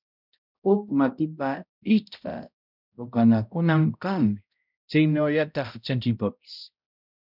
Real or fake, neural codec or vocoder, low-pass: fake; codec, 16 kHz, 0.5 kbps, X-Codec, HuBERT features, trained on balanced general audio; 5.4 kHz